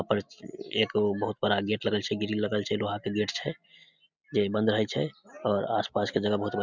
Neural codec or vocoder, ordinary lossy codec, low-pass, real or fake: none; none; 7.2 kHz; real